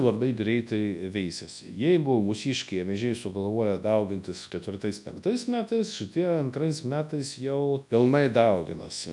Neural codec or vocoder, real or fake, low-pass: codec, 24 kHz, 0.9 kbps, WavTokenizer, large speech release; fake; 10.8 kHz